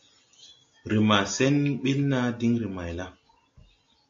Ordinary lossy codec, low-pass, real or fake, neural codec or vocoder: AAC, 48 kbps; 7.2 kHz; real; none